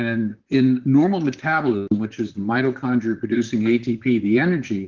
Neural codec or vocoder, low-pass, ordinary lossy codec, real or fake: vocoder, 44.1 kHz, 128 mel bands, Pupu-Vocoder; 7.2 kHz; Opus, 16 kbps; fake